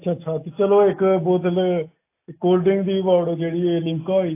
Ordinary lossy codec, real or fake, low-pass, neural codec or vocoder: AAC, 24 kbps; real; 3.6 kHz; none